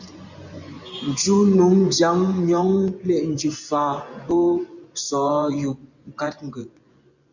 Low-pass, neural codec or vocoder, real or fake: 7.2 kHz; vocoder, 24 kHz, 100 mel bands, Vocos; fake